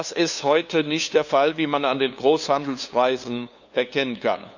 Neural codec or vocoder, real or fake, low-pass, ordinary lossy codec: codec, 16 kHz, 2 kbps, FunCodec, trained on LibriTTS, 25 frames a second; fake; 7.2 kHz; none